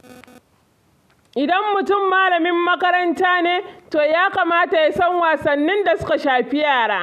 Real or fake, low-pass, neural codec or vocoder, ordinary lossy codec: real; 14.4 kHz; none; none